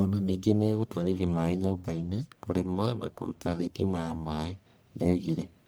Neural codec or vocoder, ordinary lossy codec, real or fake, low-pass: codec, 44.1 kHz, 1.7 kbps, Pupu-Codec; none; fake; none